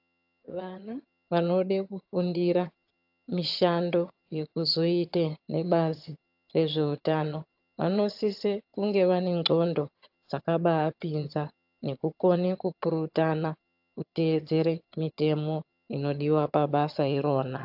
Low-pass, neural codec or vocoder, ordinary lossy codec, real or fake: 5.4 kHz; vocoder, 22.05 kHz, 80 mel bands, HiFi-GAN; AAC, 48 kbps; fake